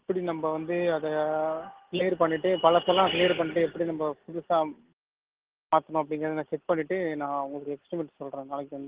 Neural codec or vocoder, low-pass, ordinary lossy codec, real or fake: none; 3.6 kHz; Opus, 16 kbps; real